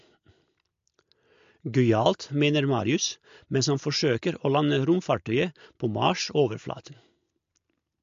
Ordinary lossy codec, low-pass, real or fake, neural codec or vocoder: MP3, 48 kbps; 7.2 kHz; real; none